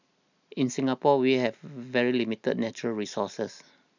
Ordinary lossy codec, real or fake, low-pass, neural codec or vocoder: none; real; 7.2 kHz; none